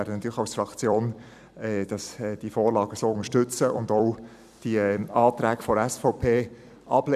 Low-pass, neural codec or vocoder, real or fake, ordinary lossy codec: 14.4 kHz; vocoder, 44.1 kHz, 128 mel bands every 256 samples, BigVGAN v2; fake; none